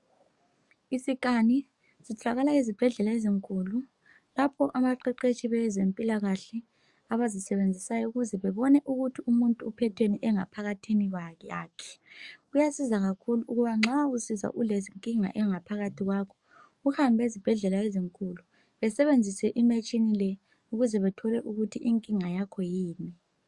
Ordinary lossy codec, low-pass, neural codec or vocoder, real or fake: Opus, 64 kbps; 10.8 kHz; codec, 44.1 kHz, 7.8 kbps, DAC; fake